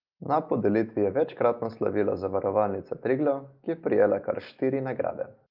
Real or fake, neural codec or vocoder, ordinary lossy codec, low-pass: real; none; Opus, 24 kbps; 5.4 kHz